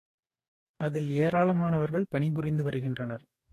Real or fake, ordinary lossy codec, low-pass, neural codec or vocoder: fake; AAC, 48 kbps; 14.4 kHz; codec, 44.1 kHz, 2.6 kbps, DAC